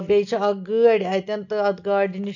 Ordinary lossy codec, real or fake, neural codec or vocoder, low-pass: none; real; none; 7.2 kHz